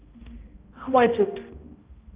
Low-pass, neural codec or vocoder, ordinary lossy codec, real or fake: 3.6 kHz; codec, 16 kHz, 0.5 kbps, X-Codec, HuBERT features, trained on balanced general audio; Opus, 32 kbps; fake